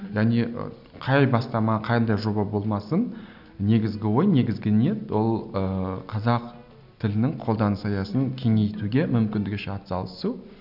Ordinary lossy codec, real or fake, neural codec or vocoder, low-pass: none; real; none; 5.4 kHz